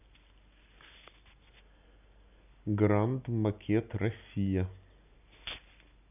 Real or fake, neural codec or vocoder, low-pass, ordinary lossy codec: real; none; 3.6 kHz; none